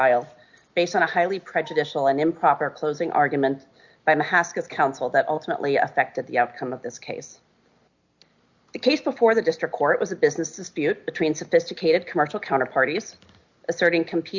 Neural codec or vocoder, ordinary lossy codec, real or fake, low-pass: none; Opus, 64 kbps; real; 7.2 kHz